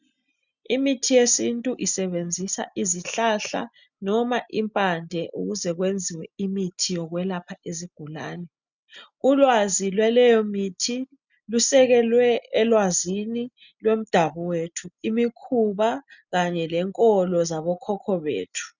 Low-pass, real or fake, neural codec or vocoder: 7.2 kHz; real; none